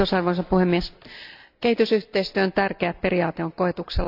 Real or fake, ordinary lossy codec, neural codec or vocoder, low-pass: real; AAC, 48 kbps; none; 5.4 kHz